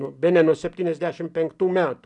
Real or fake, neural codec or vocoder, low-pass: real; none; 10.8 kHz